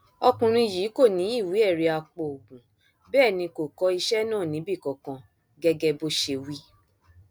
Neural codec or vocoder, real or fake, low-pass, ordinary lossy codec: none; real; none; none